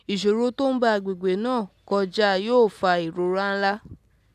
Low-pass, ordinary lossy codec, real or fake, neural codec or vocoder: 14.4 kHz; none; real; none